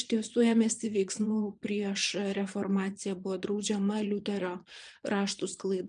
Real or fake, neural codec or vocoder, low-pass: fake; vocoder, 22.05 kHz, 80 mel bands, Vocos; 9.9 kHz